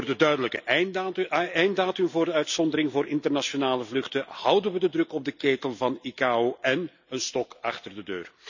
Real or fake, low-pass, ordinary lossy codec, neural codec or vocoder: real; 7.2 kHz; none; none